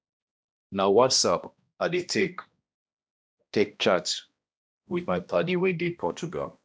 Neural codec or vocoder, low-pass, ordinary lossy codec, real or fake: codec, 16 kHz, 1 kbps, X-Codec, HuBERT features, trained on balanced general audio; none; none; fake